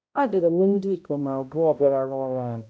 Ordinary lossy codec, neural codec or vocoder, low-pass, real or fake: none; codec, 16 kHz, 0.5 kbps, X-Codec, HuBERT features, trained on balanced general audio; none; fake